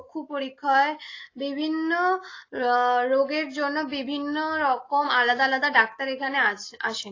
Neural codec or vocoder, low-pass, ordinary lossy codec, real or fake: none; 7.2 kHz; AAC, 32 kbps; real